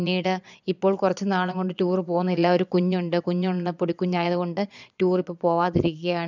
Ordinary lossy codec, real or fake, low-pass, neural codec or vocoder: none; fake; 7.2 kHz; vocoder, 22.05 kHz, 80 mel bands, Vocos